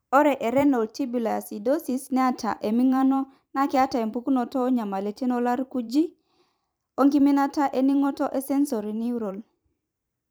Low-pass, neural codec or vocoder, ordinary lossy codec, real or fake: none; vocoder, 44.1 kHz, 128 mel bands every 512 samples, BigVGAN v2; none; fake